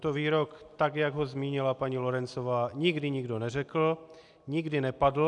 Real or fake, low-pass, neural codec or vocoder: real; 10.8 kHz; none